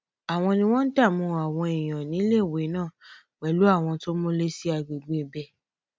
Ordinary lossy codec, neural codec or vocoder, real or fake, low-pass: none; none; real; none